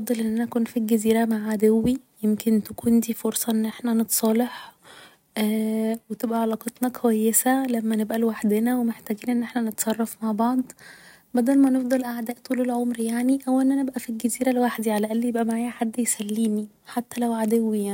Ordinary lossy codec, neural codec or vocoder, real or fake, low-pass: none; none; real; 19.8 kHz